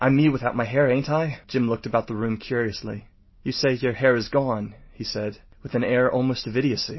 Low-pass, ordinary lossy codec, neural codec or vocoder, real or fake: 7.2 kHz; MP3, 24 kbps; none; real